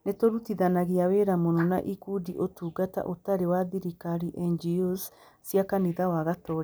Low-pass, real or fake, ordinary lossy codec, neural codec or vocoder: none; real; none; none